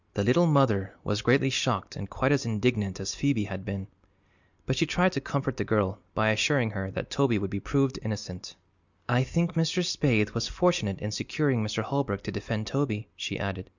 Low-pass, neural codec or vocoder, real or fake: 7.2 kHz; none; real